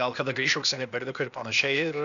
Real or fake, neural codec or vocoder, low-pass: fake; codec, 16 kHz, 0.8 kbps, ZipCodec; 7.2 kHz